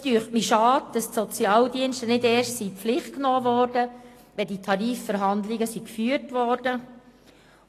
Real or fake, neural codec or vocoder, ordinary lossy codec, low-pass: real; none; AAC, 48 kbps; 14.4 kHz